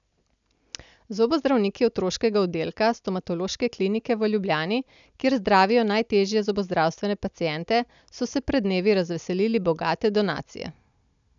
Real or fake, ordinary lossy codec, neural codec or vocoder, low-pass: real; none; none; 7.2 kHz